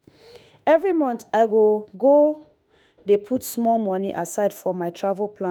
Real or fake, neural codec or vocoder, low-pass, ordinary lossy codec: fake; autoencoder, 48 kHz, 32 numbers a frame, DAC-VAE, trained on Japanese speech; none; none